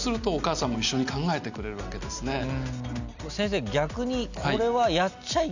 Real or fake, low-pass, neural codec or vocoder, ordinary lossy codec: real; 7.2 kHz; none; none